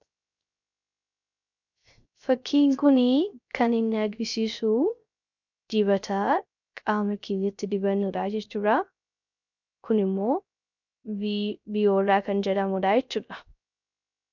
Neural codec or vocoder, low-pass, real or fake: codec, 16 kHz, 0.3 kbps, FocalCodec; 7.2 kHz; fake